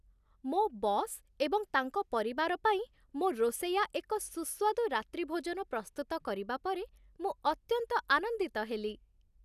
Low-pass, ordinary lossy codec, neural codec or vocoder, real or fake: 14.4 kHz; none; none; real